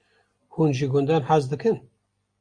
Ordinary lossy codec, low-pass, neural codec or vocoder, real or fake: Opus, 64 kbps; 9.9 kHz; none; real